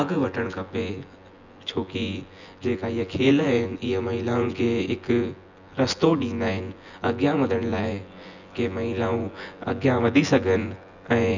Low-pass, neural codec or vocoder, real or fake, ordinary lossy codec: 7.2 kHz; vocoder, 24 kHz, 100 mel bands, Vocos; fake; none